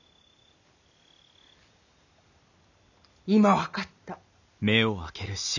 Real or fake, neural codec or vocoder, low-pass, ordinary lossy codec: real; none; 7.2 kHz; none